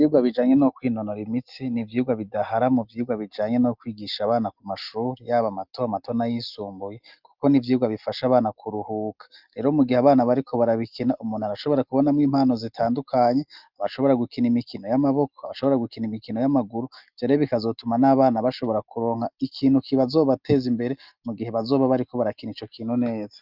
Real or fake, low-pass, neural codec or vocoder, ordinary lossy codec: real; 5.4 kHz; none; Opus, 24 kbps